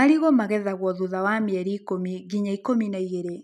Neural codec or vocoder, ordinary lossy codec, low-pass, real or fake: none; none; 14.4 kHz; real